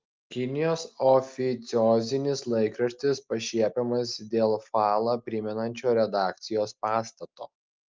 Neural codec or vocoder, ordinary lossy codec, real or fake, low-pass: none; Opus, 32 kbps; real; 7.2 kHz